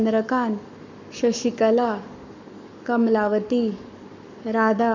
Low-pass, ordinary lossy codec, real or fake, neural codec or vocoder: 7.2 kHz; none; fake; codec, 44.1 kHz, 7.8 kbps, Pupu-Codec